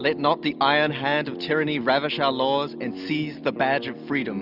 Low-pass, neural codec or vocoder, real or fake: 5.4 kHz; none; real